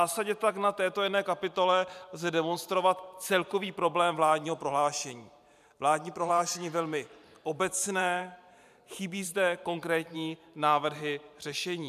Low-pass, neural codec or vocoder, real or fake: 14.4 kHz; vocoder, 44.1 kHz, 128 mel bands every 512 samples, BigVGAN v2; fake